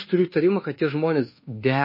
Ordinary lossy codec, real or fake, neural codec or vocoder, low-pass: MP3, 24 kbps; fake; codec, 24 kHz, 1.2 kbps, DualCodec; 5.4 kHz